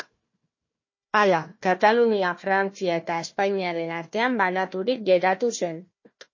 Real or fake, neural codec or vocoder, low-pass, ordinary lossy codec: fake; codec, 16 kHz, 1 kbps, FunCodec, trained on Chinese and English, 50 frames a second; 7.2 kHz; MP3, 32 kbps